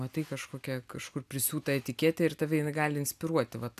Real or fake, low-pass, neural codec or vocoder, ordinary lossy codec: real; 14.4 kHz; none; AAC, 96 kbps